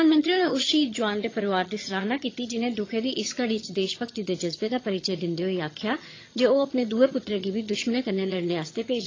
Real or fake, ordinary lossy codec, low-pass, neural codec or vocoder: fake; AAC, 32 kbps; 7.2 kHz; vocoder, 22.05 kHz, 80 mel bands, HiFi-GAN